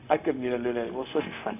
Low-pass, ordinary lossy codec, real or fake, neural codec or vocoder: 3.6 kHz; none; fake; codec, 16 kHz, 0.4 kbps, LongCat-Audio-Codec